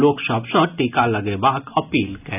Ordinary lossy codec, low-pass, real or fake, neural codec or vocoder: none; 3.6 kHz; real; none